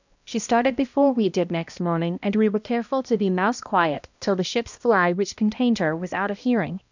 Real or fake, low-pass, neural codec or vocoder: fake; 7.2 kHz; codec, 16 kHz, 1 kbps, X-Codec, HuBERT features, trained on balanced general audio